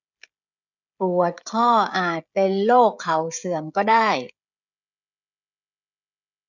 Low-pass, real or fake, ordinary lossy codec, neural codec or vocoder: 7.2 kHz; fake; none; codec, 16 kHz, 16 kbps, FreqCodec, smaller model